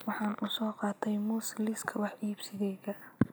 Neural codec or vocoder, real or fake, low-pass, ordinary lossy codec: none; real; none; none